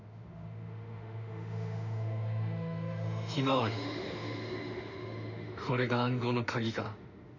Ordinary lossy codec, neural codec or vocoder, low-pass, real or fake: none; autoencoder, 48 kHz, 32 numbers a frame, DAC-VAE, trained on Japanese speech; 7.2 kHz; fake